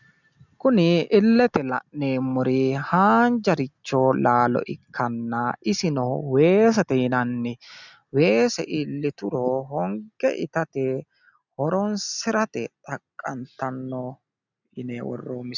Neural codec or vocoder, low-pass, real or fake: none; 7.2 kHz; real